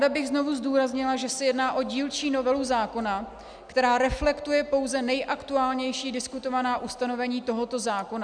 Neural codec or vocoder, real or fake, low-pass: none; real; 9.9 kHz